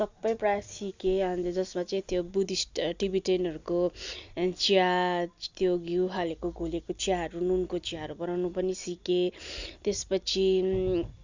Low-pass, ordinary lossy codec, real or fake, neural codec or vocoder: 7.2 kHz; Opus, 64 kbps; real; none